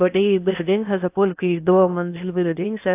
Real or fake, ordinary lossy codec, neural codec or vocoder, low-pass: fake; AAC, 32 kbps; codec, 16 kHz in and 24 kHz out, 0.6 kbps, FocalCodec, streaming, 2048 codes; 3.6 kHz